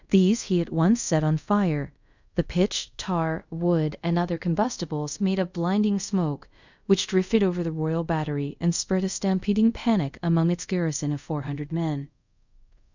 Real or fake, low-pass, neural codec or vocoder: fake; 7.2 kHz; codec, 24 kHz, 0.5 kbps, DualCodec